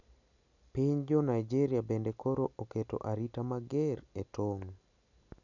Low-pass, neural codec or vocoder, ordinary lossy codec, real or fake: 7.2 kHz; none; none; real